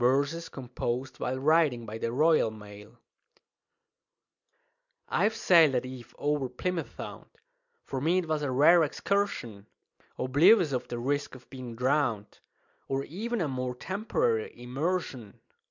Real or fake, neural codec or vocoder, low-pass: real; none; 7.2 kHz